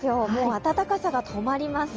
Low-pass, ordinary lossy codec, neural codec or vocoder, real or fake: 7.2 kHz; Opus, 24 kbps; none; real